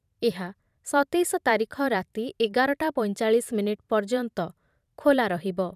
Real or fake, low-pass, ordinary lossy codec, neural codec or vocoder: fake; 14.4 kHz; none; vocoder, 44.1 kHz, 128 mel bands every 512 samples, BigVGAN v2